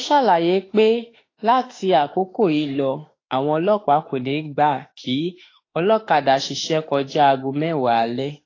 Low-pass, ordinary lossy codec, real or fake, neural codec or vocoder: 7.2 kHz; AAC, 32 kbps; fake; autoencoder, 48 kHz, 32 numbers a frame, DAC-VAE, trained on Japanese speech